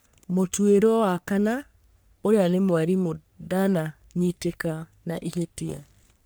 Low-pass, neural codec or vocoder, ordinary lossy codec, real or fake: none; codec, 44.1 kHz, 3.4 kbps, Pupu-Codec; none; fake